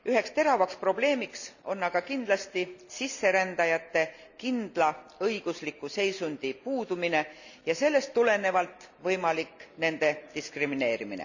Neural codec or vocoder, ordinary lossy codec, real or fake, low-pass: none; none; real; 7.2 kHz